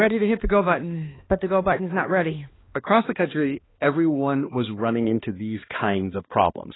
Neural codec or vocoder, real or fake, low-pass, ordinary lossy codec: codec, 16 kHz, 2 kbps, X-Codec, HuBERT features, trained on balanced general audio; fake; 7.2 kHz; AAC, 16 kbps